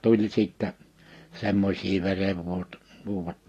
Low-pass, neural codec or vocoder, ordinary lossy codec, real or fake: 14.4 kHz; none; AAC, 48 kbps; real